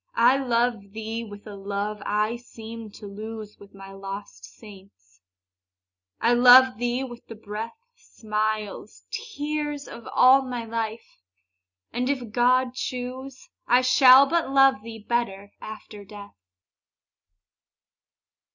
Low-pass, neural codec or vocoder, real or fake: 7.2 kHz; none; real